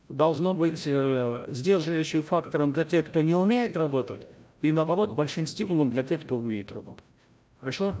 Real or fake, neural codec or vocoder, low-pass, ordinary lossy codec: fake; codec, 16 kHz, 0.5 kbps, FreqCodec, larger model; none; none